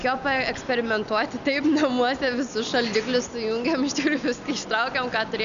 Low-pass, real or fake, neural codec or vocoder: 7.2 kHz; real; none